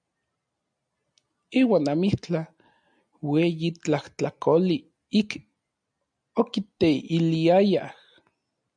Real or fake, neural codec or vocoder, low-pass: real; none; 9.9 kHz